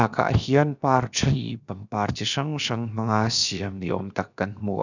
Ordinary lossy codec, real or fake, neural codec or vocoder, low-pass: none; fake; codec, 16 kHz, 0.7 kbps, FocalCodec; 7.2 kHz